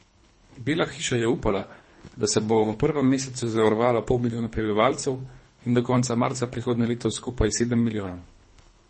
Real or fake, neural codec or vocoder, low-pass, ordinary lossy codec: fake; codec, 24 kHz, 3 kbps, HILCodec; 10.8 kHz; MP3, 32 kbps